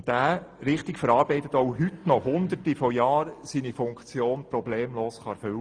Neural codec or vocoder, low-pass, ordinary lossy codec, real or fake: none; 9.9 kHz; Opus, 32 kbps; real